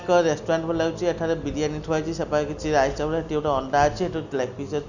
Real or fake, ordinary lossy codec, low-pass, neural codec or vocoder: real; none; 7.2 kHz; none